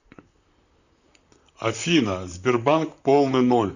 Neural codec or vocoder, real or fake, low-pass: vocoder, 44.1 kHz, 128 mel bands, Pupu-Vocoder; fake; 7.2 kHz